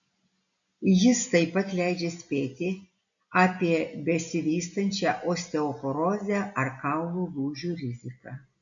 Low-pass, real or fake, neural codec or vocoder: 7.2 kHz; real; none